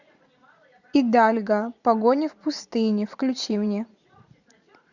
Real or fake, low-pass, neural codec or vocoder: fake; 7.2 kHz; vocoder, 44.1 kHz, 80 mel bands, Vocos